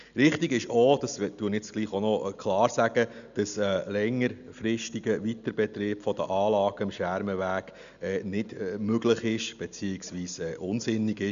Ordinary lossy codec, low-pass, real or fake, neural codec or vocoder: none; 7.2 kHz; real; none